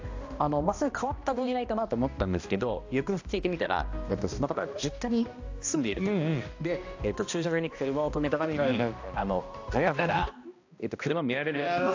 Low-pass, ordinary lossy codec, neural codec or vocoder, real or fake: 7.2 kHz; AAC, 48 kbps; codec, 16 kHz, 1 kbps, X-Codec, HuBERT features, trained on balanced general audio; fake